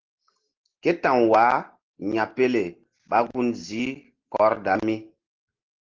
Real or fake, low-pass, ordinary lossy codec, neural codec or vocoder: real; 7.2 kHz; Opus, 16 kbps; none